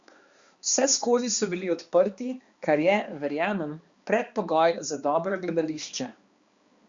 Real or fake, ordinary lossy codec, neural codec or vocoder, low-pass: fake; Opus, 64 kbps; codec, 16 kHz, 2 kbps, X-Codec, HuBERT features, trained on balanced general audio; 7.2 kHz